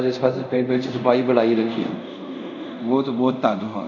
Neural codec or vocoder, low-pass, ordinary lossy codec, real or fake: codec, 24 kHz, 0.5 kbps, DualCodec; 7.2 kHz; none; fake